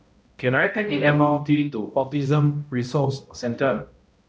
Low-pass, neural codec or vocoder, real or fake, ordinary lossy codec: none; codec, 16 kHz, 0.5 kbps, X-Codec, HuBERT features, trained on balanced general audio; fake; none